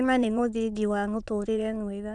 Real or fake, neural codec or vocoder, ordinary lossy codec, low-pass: fake; autoencoder, 22.05 kHz, a latent of 192 numbers a frame, VITS, trained on many speakers; none; 9.9 kHz